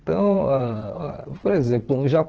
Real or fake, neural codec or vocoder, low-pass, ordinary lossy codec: fake; vocoder, 22.05 kHz, 80 mel bands, WaveNeXt; 7.2 kHz; Opus, 16 kbps